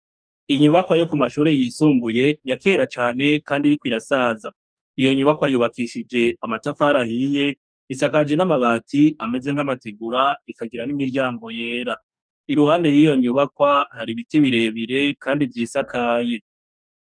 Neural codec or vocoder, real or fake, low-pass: codec, 44.1 kHz, 2.6 kbps, DAC; fake; 9.9 kHz